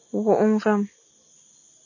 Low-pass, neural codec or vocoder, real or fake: 7.2 kHz; none; real